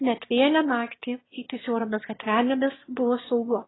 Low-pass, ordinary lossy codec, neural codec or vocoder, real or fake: 7.2 kHz; AAC, 16 kbps; autoencoder, 22.05 kHz, a latent of 192 numbers a frame, VITS, trained on one speaker; fake